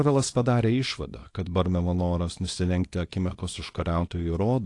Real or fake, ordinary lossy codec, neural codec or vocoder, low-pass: fake; AAC, 48 kbps; codec, 24 kHz, 0.9 kbps, WavTokenizer, small release; 10.8 kHz